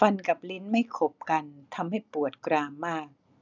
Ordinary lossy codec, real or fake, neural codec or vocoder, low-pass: none; real; none; 7.2 kHz